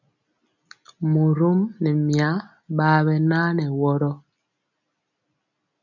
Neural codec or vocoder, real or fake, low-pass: none; real; 7.2 kHz